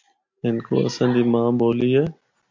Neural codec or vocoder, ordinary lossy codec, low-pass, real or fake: none; MP3, 48 kbps; 7.2 kHz; real